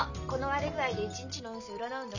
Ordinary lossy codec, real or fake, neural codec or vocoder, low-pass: none; real; none; 7.2 kHz